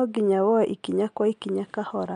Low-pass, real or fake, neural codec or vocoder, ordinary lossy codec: 9.9 kHz; real; none; MP3, 64 kbps